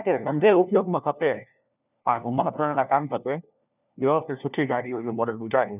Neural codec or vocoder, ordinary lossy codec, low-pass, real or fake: codec, 16 kHz, 1 kbps, FunCodec, trained on LibriTTS, 50 frames a second; none; 3.6 kHz; fake